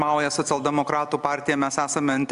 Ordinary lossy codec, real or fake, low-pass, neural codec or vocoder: AAC, 96 kbps; real; 10.8 kHz; none